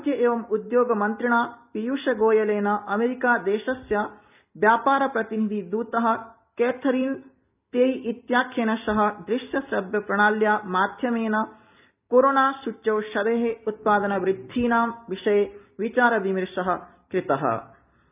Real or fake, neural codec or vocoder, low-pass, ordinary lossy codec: real; none; 3.6 kHz; none